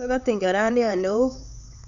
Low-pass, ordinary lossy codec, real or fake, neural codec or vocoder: 7.2 kHz; none; fake; codec, 16 kHz, 4 kbps, X-Codec, HuBERT features, trained on LibriSpeech